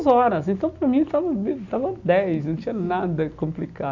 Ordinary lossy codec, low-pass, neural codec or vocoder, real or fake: none; 7.2 kHz; none; real